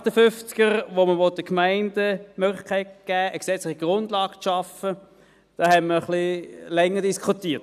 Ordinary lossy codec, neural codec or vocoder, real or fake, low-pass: none; none; real; 14.4 kHz